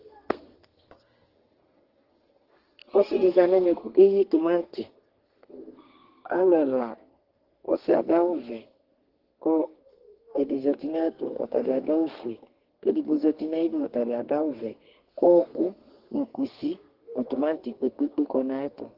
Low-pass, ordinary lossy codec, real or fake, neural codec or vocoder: 5.4 kHz; Opus, 16 kbps; fake; codec, 32 kHz, 1.9 kbps, SNAC